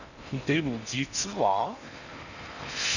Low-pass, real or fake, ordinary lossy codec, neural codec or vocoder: 7.2 kHz; fake; none; codec, 16 kHz in and 24 kHz out, 0.6 kbps, FocalCodec, streaming, 4096 codes